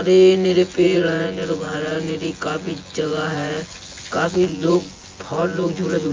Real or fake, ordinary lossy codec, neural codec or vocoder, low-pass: fake; Opus, 32 kbps; vocoder, 24 kHz, 100 mel bands, Vocos; 7.2 kHz